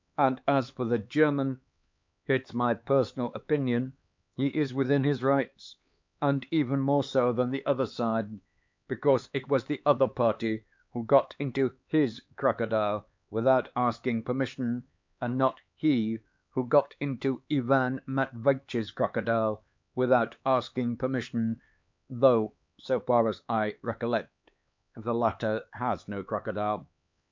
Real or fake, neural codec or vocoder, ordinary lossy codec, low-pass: fake; codec, 16 kHz, 4 kbps, X-Codec, HuBERT features, trained on LibriSpeech; MP3, 64 kbps; 7.2 kHz